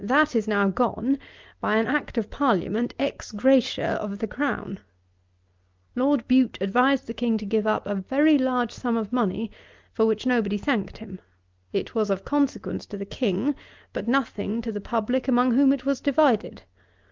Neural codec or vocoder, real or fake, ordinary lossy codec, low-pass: none; real; Opus, 24 kbps; 7.2 kHz